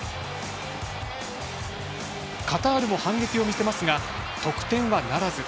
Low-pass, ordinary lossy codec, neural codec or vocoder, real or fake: none; none; none; real